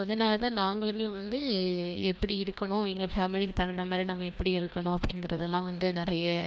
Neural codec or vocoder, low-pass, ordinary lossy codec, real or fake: codec, 16 kHz, 1 kbps, FreqCodec, larger model; none; none; fake